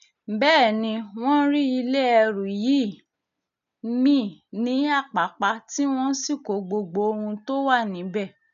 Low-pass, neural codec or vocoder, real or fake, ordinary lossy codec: 7.2 kHz; none; real; none